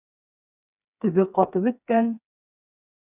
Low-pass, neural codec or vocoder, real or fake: 3.6 kHz; codec, 16 kHz, 4 kbps, FreqCodec, smaller model; fake